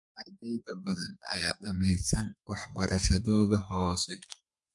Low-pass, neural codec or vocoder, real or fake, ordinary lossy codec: 10.8 kHz; codec, 32 kHz, 1.9 kbps, SNAC; fake; MP3, 64 kbps